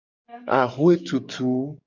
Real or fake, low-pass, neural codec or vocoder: fake; 7.2 kHz; codec, 44.1 kHz, 7.8 kbps, DAC